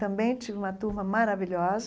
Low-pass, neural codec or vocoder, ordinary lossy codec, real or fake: none; none; none; real